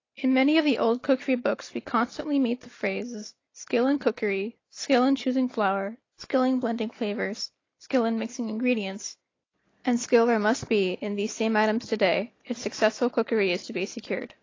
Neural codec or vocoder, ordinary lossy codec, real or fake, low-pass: none; AAC, 32 kbps; real; 7.2 kHz